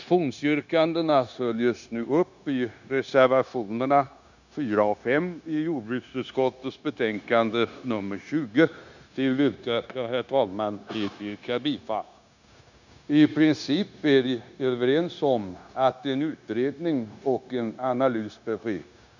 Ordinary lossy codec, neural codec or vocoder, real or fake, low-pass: none; codec, 16 kHz, 0.9 kbps, LongCat-Audio-Codec; fake; 7.2 kHz